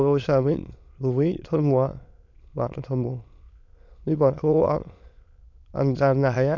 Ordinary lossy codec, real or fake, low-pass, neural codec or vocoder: none; fake; 7.2 kHz; autoencoder, 22.05 kHz, a latent of 192 numbers a frame, VITS, trained on many speakers